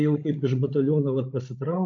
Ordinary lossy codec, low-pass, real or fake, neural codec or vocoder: MP3, 48 kbps; 7.2 kHz; fake; codec, 16 kHz, 16 kbps, FreqCodec, larger model